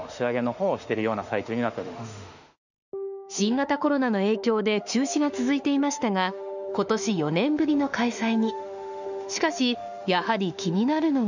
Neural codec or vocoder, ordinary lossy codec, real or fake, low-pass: autoencoder, 48 kHz, 32 numbers a frame, DAC-VAE, trained on Japanese speech; none; fake; 7.2 kHz